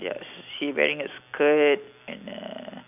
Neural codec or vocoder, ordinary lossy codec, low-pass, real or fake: none; none; 3.6 kHz; real